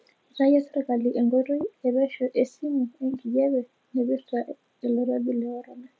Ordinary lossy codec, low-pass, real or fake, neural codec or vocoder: none; none; real; none